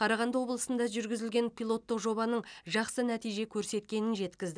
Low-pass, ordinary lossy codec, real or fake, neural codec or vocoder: 9.9 kHz; MP3, 96 kbps; real; none